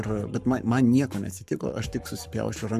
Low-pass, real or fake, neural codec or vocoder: 14.4 kHz; fake; codec, 44.1 kHz, 7.8 kbps, Pupu-Codec